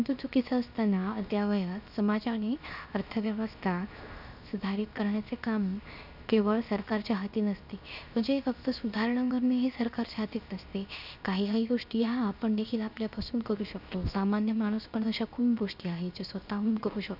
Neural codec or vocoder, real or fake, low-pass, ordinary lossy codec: codec, 16 kHz, 0.7 kbps, FocalCodec; fake; 5.4 kHz; none